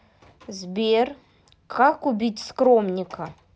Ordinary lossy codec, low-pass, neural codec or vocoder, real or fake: none; none; none; real